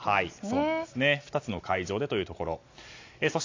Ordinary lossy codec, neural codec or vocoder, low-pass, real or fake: AAC, 48 kbps; none; 7.2 kHz; real